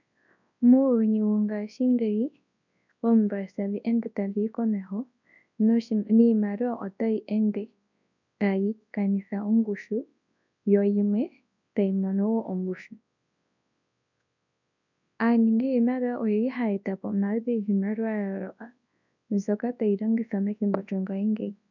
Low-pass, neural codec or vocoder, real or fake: 7.2 kHz; codec, 24 kHz, 0.9 kbps, WavTokenizer, large speech release; fake